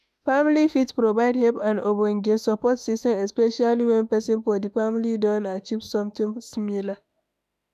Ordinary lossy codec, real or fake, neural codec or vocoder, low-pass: none; fake; autoencoder, 48 kHz, 32 numbers a frame, DAC-VAE, trained on Japanese speech; 14.4 kHz